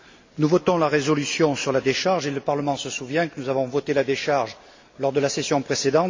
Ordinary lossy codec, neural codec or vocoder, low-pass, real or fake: MP3, 48 kbps; none; 7.2 kHz; real